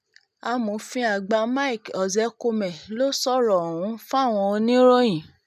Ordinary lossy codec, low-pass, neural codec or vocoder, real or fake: none; 14.4 kHz; none; real